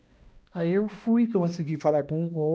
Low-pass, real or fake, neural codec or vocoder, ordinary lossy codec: none; fake; codec, 16 kHz, 1 kbps, X-Codec, HuBERT features, trained on balanced general audio; none